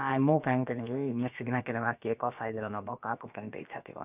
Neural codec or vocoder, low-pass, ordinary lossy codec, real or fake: codec, 16 kHz, about 1 kbps, DyCAST, with the encoder's durations; 3.6 kHz; none; fake